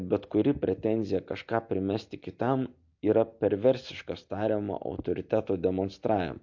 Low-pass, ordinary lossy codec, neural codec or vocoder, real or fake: 7.2 kHz; MP3, 48 kbps; none; real